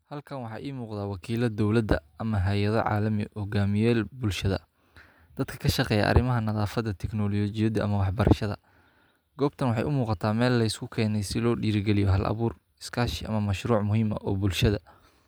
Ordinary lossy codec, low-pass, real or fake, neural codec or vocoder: none; none; real; none